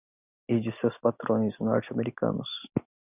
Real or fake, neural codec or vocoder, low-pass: real; none; 3.6 kHz